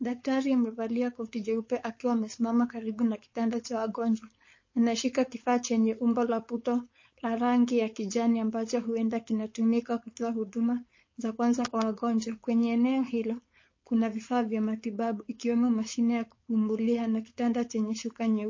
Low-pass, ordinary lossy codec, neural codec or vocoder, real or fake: 7.2 kHz; MP3, 32 kbps; codec, 16 kHz, 4.8 kbps, FACodec; fake